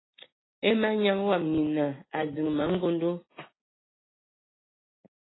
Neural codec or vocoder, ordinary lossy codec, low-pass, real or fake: none; AAC, 16 kbps; 7.2 kHz; real